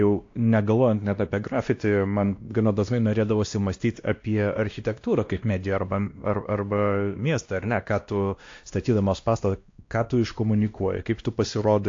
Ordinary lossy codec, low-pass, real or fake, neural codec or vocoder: AAC, 48 kbps; 7.2 kHz; fake; codec, 16 kHz, 1 kbps, X-Codec, WavLM features, trained on Multilingual LibriSpeech